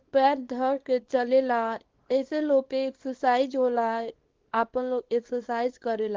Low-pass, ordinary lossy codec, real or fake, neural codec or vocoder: 7.2 kHz; Opus, 16 kbps; fake; codec, 24 kHz, 0.9 kbps, WavTokenizer, small release